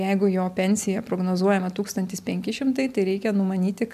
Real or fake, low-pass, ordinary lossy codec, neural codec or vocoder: real; 14.4 kHz; MP3, 96 kbps; none